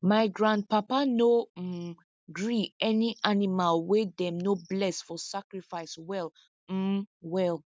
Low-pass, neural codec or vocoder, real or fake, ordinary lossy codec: none; none; real; none